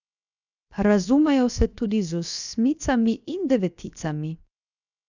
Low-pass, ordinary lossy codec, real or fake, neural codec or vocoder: 7.2 kHz; none; fake; codec, 16 kHz, 0.7 kbps, FocalCodec